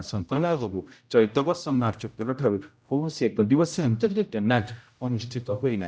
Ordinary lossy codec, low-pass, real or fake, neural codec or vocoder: none; none; fake; codec, 16 kHz, 0.5 kbps, X-Codec, HuBERT features, trained on general audio